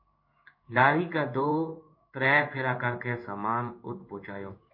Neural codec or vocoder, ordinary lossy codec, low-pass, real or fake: codec, 16 kHz in and 24 kHz out, 1 kbps, XY-Tokenizer; MP3, 32 kbps; 5.4 kHz; fake